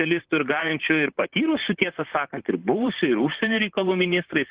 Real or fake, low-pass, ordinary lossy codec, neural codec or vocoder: fake; 3.6 kHz; Opus, 16 kbps; vocoder, 44.1 kHz, 128 mel bands, Pupu-Vocoder